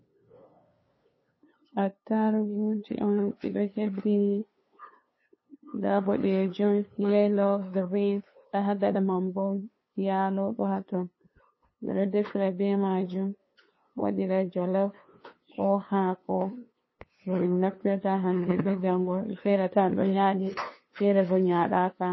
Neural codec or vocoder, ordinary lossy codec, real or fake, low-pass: codec, 16 kHz, 2 kbps, FunCodec, trained on LibriTTS, 25 frames a second; MP3, 24 kbps; fake; 7.2 kHz